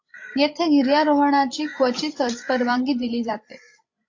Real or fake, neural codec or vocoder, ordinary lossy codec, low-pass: fake; codec, 16 kHz, 16 kbps, FreqCodec, larger model; AAC, 48 kbps; 7.2 kHz